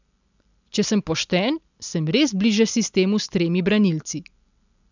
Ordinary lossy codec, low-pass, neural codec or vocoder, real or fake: none; 7.2 kHz; none; real